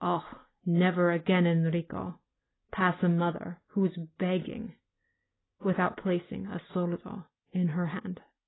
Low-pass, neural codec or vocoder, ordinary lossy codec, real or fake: 7.2 kHz; none; AAC, 16 kbps; real